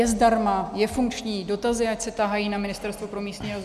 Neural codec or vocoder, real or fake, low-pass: none; real; 14.4 kHz